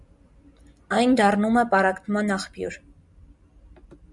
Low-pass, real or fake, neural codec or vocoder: 10.8 kHz; real; none